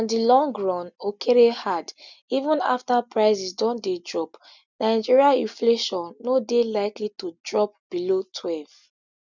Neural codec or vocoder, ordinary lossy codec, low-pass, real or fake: none; none; 7.2 kHz; real